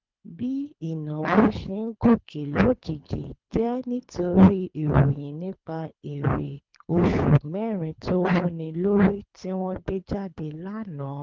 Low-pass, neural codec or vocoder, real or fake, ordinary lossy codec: 7.2 kHz; codec, 24 kHz, 3 kbps, HILCodec; fake; Opus, 24 kbps